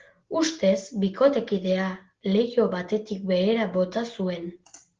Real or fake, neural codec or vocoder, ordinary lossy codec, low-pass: real; none; Opus, 16 kbps; 7.2 kHz